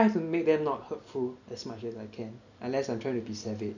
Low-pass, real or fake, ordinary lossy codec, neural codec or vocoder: 7.2 kHz; real; none; none